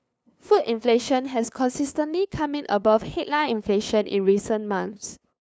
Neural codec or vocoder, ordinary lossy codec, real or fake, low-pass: codec, 16 kHz, 2 kbps, FunCodec, trained on LibriTTS, 25 frames a second; none; fake; none